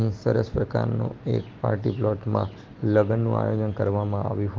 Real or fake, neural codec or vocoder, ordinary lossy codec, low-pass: real; none; Opus, 16 kbps; 7.2 kHz